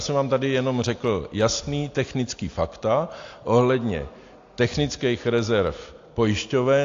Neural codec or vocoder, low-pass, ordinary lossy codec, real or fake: none; 7.2 kHz; AAC, 48 kbps; real